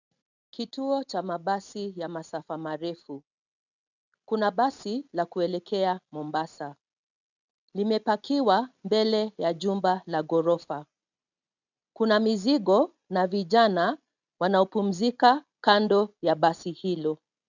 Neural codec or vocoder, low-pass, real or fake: none; 7.2 kHz; real